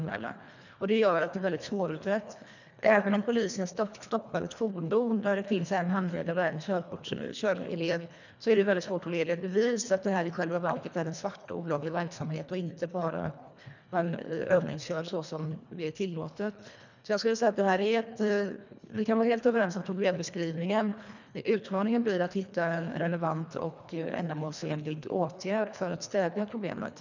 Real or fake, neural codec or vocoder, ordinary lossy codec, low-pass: fake; codec, 24 kHz, 1.5 kbps, HILCodec; none; 7.2 kHz